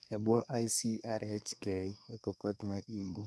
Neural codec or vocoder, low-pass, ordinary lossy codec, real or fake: codec, 24 kHz, 1 kbps, SNAC; none; none; fake